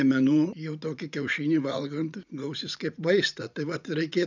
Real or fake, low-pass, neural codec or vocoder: fake; 7.2 kHz; vocoder, 44.1 kHz, 80 mel bands, Vocos